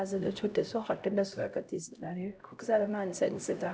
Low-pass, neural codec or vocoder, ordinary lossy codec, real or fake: none; codec, 16 kHz, 0.5 kbps, X-Codec, HuBERT features, trained on LibriSpeech; none; fake